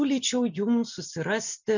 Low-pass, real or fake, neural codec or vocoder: 7.2 kHz; real; none